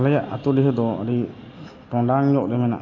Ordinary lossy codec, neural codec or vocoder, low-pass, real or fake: AAC, 32 kbps; none; 7.2 kHz; real